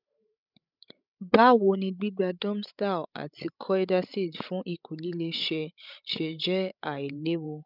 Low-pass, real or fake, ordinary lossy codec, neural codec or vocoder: 5.4 kHz; fake; none; codec, 16 kHz, 16 kbps, FreqCodec, larger model